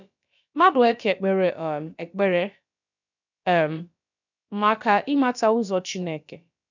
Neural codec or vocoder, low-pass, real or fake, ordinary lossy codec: codec, 16 kHz, about 1 kbps, DyCAST, with the encoder's durations; 7.2 kHz; fake; none